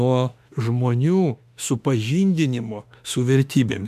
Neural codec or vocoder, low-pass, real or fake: autoencoder, 48 kHz, 32 numbers a frame, DAC-VAE, trained on Japanese speech; 14.4 kHz; fake